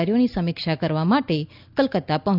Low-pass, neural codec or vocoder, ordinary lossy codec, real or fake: 5.4 kHz; none; none; real